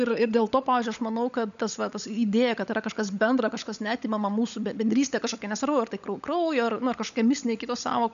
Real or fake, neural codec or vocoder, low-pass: fake; codec, 16 kHz, 16 kbps, FunCodec, trained on LibriTTS, 50 frames a second; 7.2 kHz